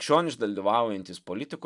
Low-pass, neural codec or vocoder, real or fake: 10.8 kHz; none; real